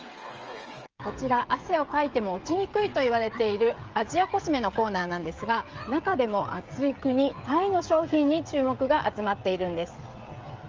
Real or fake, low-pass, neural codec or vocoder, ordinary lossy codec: fake; 7.2 kHz; codec, 16 kHz, 16 kbps, FreqCodec, smaller model; Opus, 24 kbps